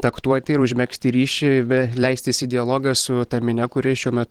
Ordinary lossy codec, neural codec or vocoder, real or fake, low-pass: Opus, 16 kbps; vocoder, 44.1 kHz, 128 mel bands every 512 samples, BigVGAN v2; fake; 19.8 kHz